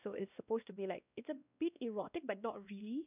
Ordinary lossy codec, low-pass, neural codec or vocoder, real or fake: none; 3.6 kHz; codec, 16 kHz, 1 kbps, X-Codec, WavLM features, trained on Multilingual LibriSpeech; fake